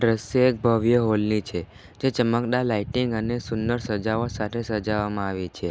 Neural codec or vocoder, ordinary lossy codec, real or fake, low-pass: none; none; real; none